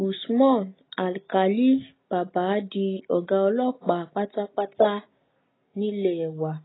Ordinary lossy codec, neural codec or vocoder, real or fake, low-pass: AAC, 16 kbps; none; real; 7.2 kHz